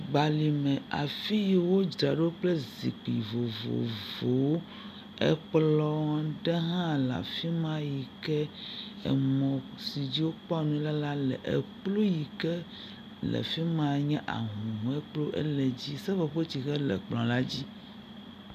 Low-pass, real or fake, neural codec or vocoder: 14.4 kHz; real; none